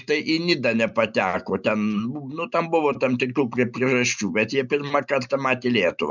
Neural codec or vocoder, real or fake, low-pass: none; real; 7.2 kHz